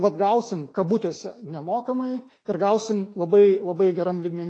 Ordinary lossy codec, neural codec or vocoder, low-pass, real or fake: AAC, 32 kbps; autoencoder, 48 kHz, 32 numbers a frame, DAC-VAE, trained on Japanese speech; 9.9 kHz; fake